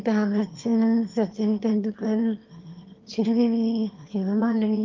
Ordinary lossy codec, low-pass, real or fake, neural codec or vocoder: Opus, 32 kbps; 7.2 kHz; fake; autoencoder, 22.05 kHz, a latent of 192 numbers a frame, VITS, trained on one speaker